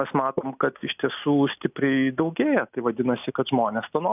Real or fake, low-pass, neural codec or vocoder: real; 3.6 kHz; none